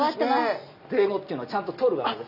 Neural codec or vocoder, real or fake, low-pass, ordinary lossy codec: none; real; 5.4 kHz; none